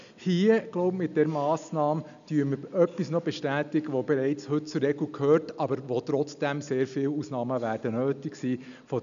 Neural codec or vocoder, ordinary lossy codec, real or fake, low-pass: none; none; real; 7.2 kHz